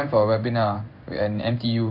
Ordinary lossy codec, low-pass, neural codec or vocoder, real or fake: none; 5.4 kHz; codec, 16 kHz in and 24 kHz out, 1 kbps, XY-Tokenizer; fake